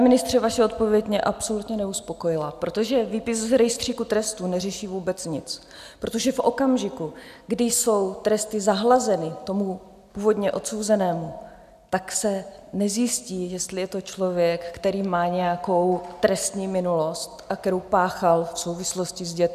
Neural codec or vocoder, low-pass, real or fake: none; 14.4 kHz; real